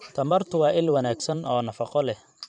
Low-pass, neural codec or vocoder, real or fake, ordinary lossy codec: 10.8 kHz; vocoder, 44.1 kHz, 128 mel bands every 512 samples, BigVGAN v2; fake; none